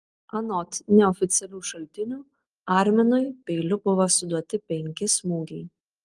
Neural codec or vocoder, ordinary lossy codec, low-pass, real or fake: none; Opus, 24 kbps; 10.8 kHz; real